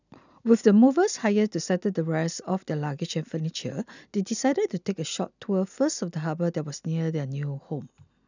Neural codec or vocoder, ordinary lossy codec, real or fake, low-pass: none; none; real; 7.2 kHz